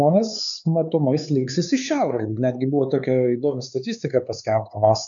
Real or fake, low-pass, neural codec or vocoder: fake; 7.2 kHz; codec, 16 kHz, 4 kbps, X-Codec, HuBERT features, trained on LibriSpeech